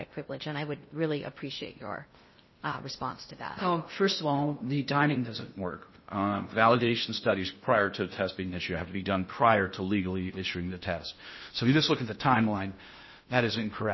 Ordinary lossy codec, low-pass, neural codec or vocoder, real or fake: MP3, 24 kbps; 7.2 kHz; codec, 16 kHz in and 24 kHz out, 0.6 kbps, FocalCodec, streaming, 2048 codes; fake